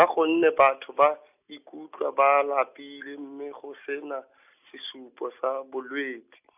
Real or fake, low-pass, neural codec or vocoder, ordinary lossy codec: real; 3.6 kHz; none; none